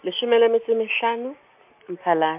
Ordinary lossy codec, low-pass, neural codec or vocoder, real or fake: MP3, 32 kbps; 3.6 kHz; none; real